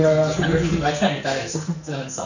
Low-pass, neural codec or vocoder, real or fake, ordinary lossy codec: 7.2 kHz; codec, 44.1 kHz, 2.6 kbps, SNAC; fake; none